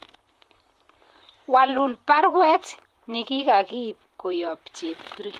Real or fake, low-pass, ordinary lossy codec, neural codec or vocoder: fake; 14.4 kHz; Opus, 32 kbps; vocoder, 44.1 kHz, 128 mel bands, Pupu-Vocoder